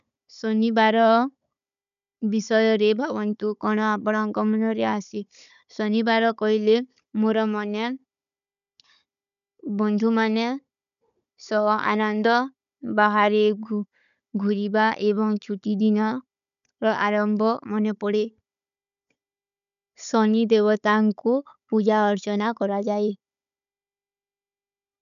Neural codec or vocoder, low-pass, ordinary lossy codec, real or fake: codec, 16 kHz, 16 kbps, FunCodec, trained on Chinese and English, 50 frames a second; 7.2 kHz; none; fake